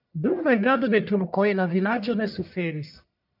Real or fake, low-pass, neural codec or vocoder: fake; 5.4 kHz; codec, 44.1 kHz, 1.7 kbps, Pupu-Codec